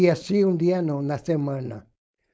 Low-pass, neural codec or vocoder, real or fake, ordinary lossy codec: none; codec, 16 kHz, 4.8 kbps, FACodec; fake; none